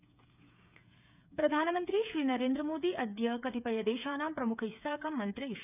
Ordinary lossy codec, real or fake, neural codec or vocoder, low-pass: none; fake; codec, 16 kHz, 8 kbps, FreqCodec, smaller model; 3.6 kHz